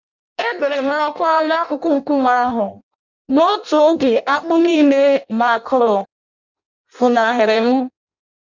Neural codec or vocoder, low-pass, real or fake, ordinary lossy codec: codec, 16 kHz in and 24 kHz out, 0.6 kbps, FireRedTTS-2 codec; 7.2 kHz; fake; none